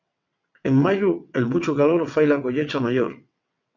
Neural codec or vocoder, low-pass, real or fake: vocoder, 22.05 kHz, 80 mel bands, WaveNeXt; 7.2 kHz; fake